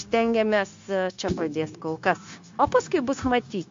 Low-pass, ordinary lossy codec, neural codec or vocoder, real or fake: 7.2 kHz; MP3, 48 kbps; codec, 16 kHz, 0.9 kbps, LongCat-Audio-Codec; fake